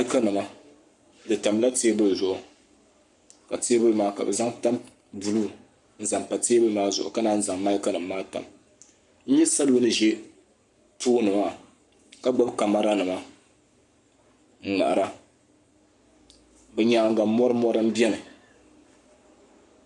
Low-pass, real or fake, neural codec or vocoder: 10.8 kHz; fake; codec, 44.1 kHz, 7.8 kbps, Pupu-Codec